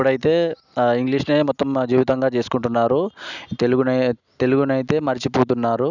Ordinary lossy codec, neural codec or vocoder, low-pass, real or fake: none; none; 7.2 kHz; real